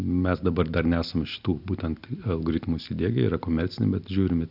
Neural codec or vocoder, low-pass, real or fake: none; 5.4 kHz; real